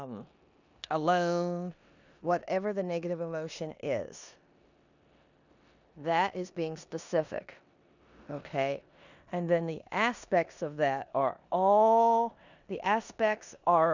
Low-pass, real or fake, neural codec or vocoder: 7.2 kHz; fake; codec, 16 kHz in and 24 kHz out, 0.9 kbps, LongCat-Audio-Codec, four codebook decoder